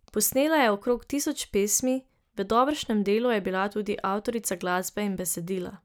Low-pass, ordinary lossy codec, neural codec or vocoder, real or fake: none; none; none; real